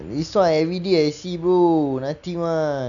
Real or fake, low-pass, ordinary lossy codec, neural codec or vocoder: real; 7.2 kHz; none; none